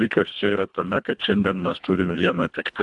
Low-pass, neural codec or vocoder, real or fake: 10.8 kHz; codec, 24 kHz, 1.5 kbps, HILCodec; fake